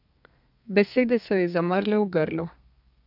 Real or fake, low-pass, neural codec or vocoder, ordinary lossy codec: fake; 5.4 kHz; codec, 32 kHz, 1.9 kbps, SNAC; none